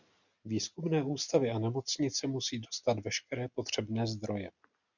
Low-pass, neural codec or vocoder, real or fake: 7.2 kHz; none; real